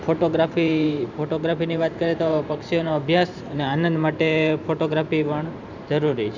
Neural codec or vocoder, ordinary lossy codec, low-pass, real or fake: vocoder, 44.1 kHz, 128 mel bands every 512 samples, BigVGAN v2; none; 7.2 kHz; fake